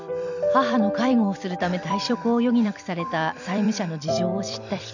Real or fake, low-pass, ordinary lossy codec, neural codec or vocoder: fake; 7.2 kHz; none; vocoder, 44.1 kHz, 128 mel bands every 256 samples, BigVGAN v2